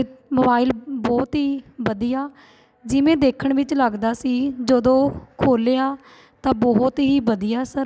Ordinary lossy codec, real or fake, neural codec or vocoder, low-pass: none; real; none; none